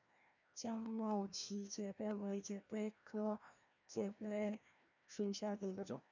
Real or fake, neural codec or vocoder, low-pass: fake; codec, 16 kHz, 1 kbps, FreqCodec, larger model; 7.2 kHz